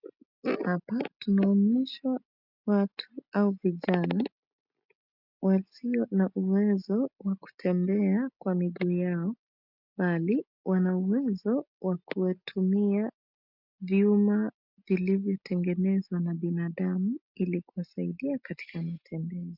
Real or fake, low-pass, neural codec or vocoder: real; 5.4 kHz; none